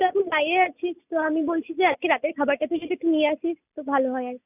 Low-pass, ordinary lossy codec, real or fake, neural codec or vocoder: 3.6 kHz; none; real; none